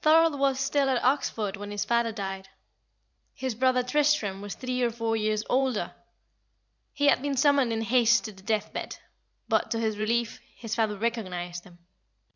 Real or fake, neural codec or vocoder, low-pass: fake; vocoder, 44.1 kHz, 128 mel bands every 512 samples, BigVGAN v2; 7.2 kHz